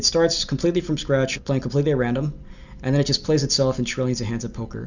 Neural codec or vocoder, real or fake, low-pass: none; real; 7.2 kHz